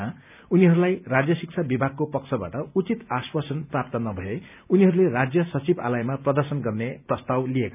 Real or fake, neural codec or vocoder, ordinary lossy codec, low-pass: real; none; none; 3.6 kHz